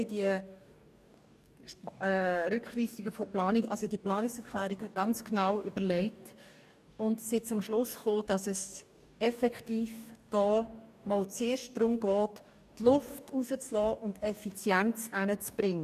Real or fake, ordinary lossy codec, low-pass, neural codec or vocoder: fake; none; 14.4 kHz; codec, 44.1 kHz, 2.6 kbps, DAC